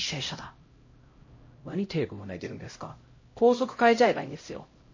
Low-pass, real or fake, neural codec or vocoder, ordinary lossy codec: 7.2 kHz; fake; codec, 16 kHz, 0.5 kbps, X-Codec, HuBERT features, trained on LibriSpeech; MP3, 32 kbps